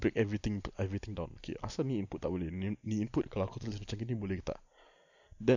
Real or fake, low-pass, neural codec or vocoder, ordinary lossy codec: real; 7.2 kHz; none; none